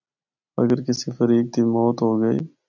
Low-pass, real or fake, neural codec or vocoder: 7.2 kHz; real; none